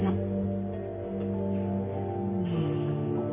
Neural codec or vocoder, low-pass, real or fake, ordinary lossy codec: none; 3.6 kHz; real; none